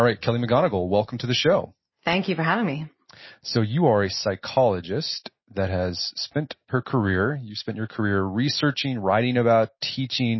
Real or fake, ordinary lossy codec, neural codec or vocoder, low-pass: real; MP3, 24 kbps; none; 7.2 kHz